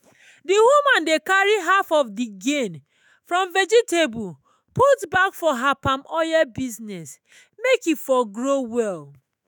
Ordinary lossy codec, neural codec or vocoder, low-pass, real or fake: none; autoencoder, 48 kHz, 128 numbers a frame, DAC-VAE, trained on Japanese speech; none; fake